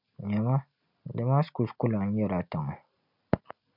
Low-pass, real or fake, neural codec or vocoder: 5.4 kHz; real; none